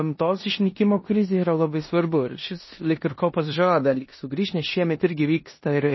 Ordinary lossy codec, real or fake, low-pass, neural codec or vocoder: MP3, 24 kbps; fake; 7.2 kHz; codec, 16 kHz in and 24 kHz out, 0.9 kbps, LongCat-Audio-Codec, four codebook decoder